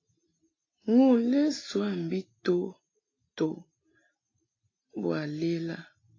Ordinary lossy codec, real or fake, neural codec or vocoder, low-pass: AAC, 32 kbps; real; none; 7.2 kHz